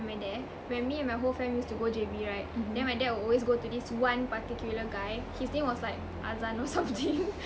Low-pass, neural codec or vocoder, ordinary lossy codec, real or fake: none; none; none; real